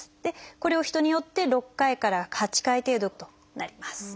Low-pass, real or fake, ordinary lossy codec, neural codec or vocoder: none; real; none; none